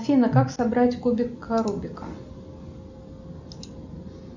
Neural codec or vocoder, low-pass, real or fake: none; 7.2 kHz; real